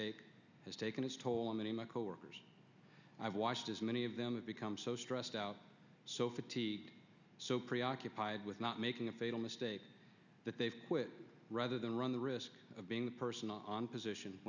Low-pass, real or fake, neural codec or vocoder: 7.2 kHz; real; none